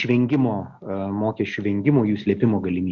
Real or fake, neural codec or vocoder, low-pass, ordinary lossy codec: real; none; 7.2 kHz; Opus, 64 kbps